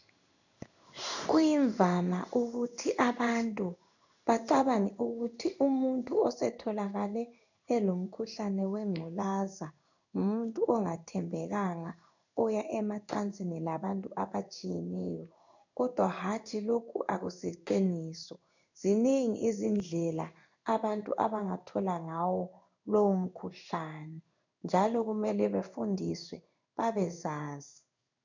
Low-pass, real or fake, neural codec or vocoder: 7.2 kHz; fake; codec, 16 kHz in and 24 kHz out, 1 kbps, XY-Tokenizer